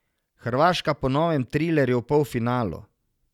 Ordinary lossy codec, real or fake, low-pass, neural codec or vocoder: none; real; 19.8 kHz; none